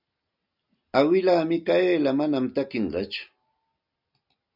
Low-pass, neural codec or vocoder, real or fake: 5.4 kHz; none; real